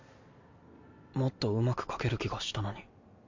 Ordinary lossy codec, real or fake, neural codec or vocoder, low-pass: MP3, 64 kbps; real; none; 7.2 kHz